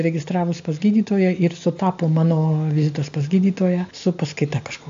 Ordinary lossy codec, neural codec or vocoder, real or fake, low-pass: AAC, 64 kbps; none; real; 7.2 kHz